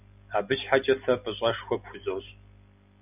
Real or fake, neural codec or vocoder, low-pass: real; none; 3.6 kHz